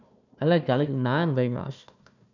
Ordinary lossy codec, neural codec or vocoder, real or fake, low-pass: none; codec, 16 kHz, 1 kbps, FunCodec, trained on Chinese and English, 50 frames a second; fake; 7.2 kHz